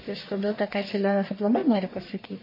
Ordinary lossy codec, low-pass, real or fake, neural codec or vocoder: MP3, 24 kbps; 5.4 kHz; fake; codec, 44.1 kHz, 1.7 kbps, Pupu-Codec